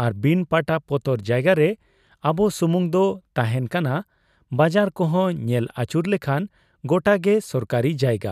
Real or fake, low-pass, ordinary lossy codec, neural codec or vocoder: real; 14.4 kHz; none; none